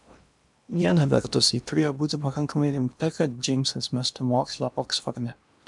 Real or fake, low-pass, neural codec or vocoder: fake; 10.8 kHz; codec, 16 kHz in and 24 kHz out, 0.8 kbps, FocalCodec, streaming, 65536 codes